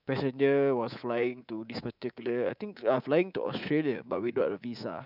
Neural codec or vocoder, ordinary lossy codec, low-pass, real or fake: codec, 24 kHz, 3.1 kbps, DualCodec; none; 5.4 kHz; fake